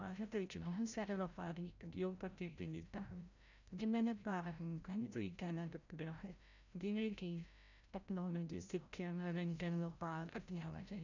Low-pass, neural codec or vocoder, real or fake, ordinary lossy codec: 7.2 kHz; codec, 16 kHz, 0.5 kbps, FreqCodec, larger model; fake; none